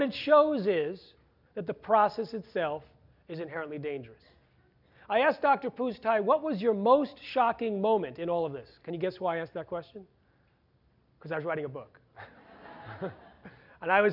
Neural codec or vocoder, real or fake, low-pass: none; real; 5.4 kHz